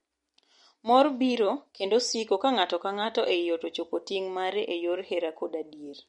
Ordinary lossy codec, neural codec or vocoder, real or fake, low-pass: MP3, 48 kbps; none; real; 10.8 kHz